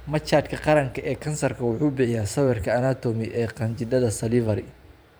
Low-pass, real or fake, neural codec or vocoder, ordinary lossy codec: none; fake; vocoder, 44.1 kHz, 128 mel bands every 512 samples, BigVGAN v2; none